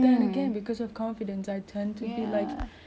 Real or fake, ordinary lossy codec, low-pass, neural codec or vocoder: real; none; none; none